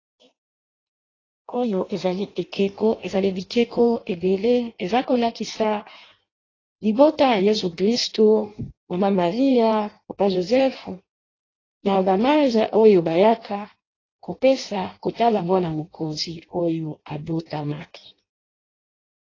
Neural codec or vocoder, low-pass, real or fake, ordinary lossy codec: codec, 16 kHz in and 24 kHz out, 0.6 kbps, FireRedTTS-2 codec; 7.2 kHz; fake; AAC, 32 kbps